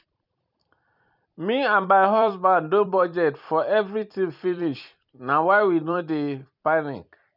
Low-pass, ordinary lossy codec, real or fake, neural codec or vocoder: 5.4 kHz; none; fake; vocoder, 44.1 kHz, 128 mel bands every 256 samples, BigVGAN v2